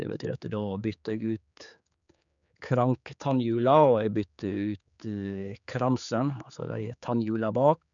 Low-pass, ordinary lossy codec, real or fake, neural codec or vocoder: 7.2 kHz; none; fake; codec, 16 kHz, 4 kbps, X-Codec, HuBERT features, trained on general audio